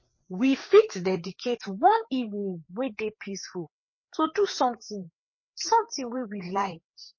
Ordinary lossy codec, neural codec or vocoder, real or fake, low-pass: MP3, 32 kbps; codec, 16 kHz, 4 kbps, FreqCodec, larger model; fake; 7.2 kHz